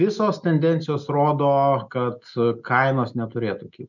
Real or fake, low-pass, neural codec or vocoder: real; 7.2 kHz; none